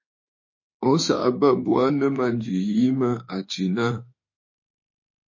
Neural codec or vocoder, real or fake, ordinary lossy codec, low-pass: autoencoder, 48 kHz, 32 numbers a frame, DAC-VAE, trained on Japanese speech; fake; MP3, 32 kbps; 7.2 kHz